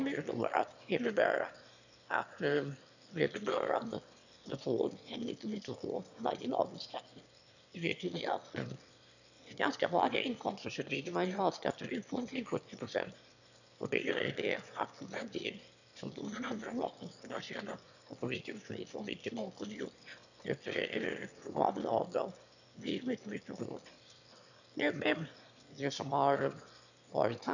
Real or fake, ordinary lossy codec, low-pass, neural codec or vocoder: fake; none; 7.2 kHz; autoencoder, 22.05 kHz, a latent of 192 numbers a frame, VITS, trained on one speaker